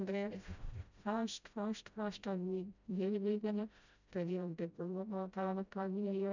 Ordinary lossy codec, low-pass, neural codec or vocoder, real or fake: none; 7.2 kHz; codec, 16 kHz, 0.5 kbps, FreqCodec, smaller model; fake